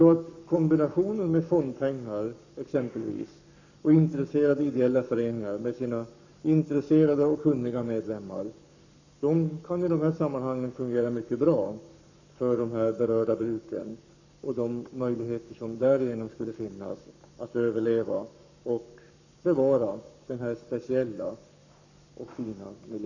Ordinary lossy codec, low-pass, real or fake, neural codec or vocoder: none; 7.2 kHz; fake; codec, 44.1 kHz, 7.8 kbps, Pupu-Codec